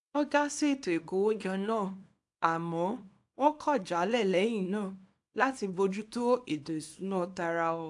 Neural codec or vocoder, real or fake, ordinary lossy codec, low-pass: codec, 24 kHz, 0.9 kbps, WavTokenizer, small release; fake; AAC, 64 kbps; 10.8 kHz